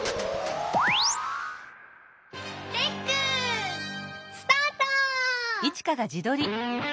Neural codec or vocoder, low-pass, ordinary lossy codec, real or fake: none; none; none; real